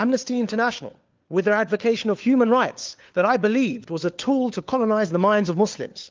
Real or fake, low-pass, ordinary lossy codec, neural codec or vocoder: fake; 7.2 kHz; Opus, 32 kbps; codec, 16 kHz, 4 kbps, FunCodec, trained on LibriTTS, 50 frames a second